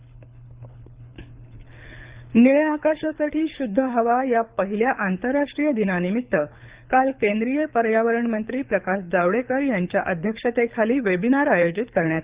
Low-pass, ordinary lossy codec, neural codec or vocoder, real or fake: 3.6 kHz; Opus, 64 kbps; codec, 24 kHz, 6 kbps, HILCodec; fake